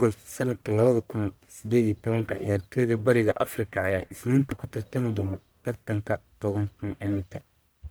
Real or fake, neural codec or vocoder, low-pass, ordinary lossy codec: fake; codec, 44.1 kHz, 1.7 kbps, Pupu-Codec; none; none